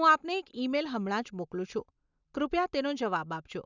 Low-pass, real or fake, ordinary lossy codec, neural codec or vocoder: 7.2 kHz; real; none; none